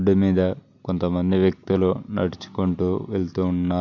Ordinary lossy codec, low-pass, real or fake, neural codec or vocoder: none; 7.2 kHz; real; none